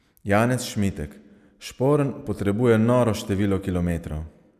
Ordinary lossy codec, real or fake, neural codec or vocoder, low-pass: none; real; none; 14.4 kHz